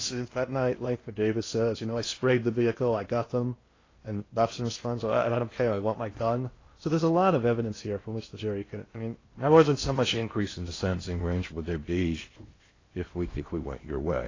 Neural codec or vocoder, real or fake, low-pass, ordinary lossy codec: codec, 16 kHz in and 24 kHz out, 0.8 kbps, FocalCodec, streaming, 65536 codes; fake; 7.2 kHz; AAC, 32 kbps